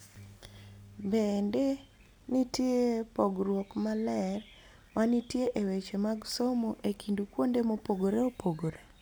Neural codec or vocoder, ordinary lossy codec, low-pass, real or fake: none; none; none; real